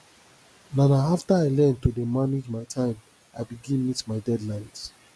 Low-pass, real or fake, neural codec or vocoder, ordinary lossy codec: none; real; none; none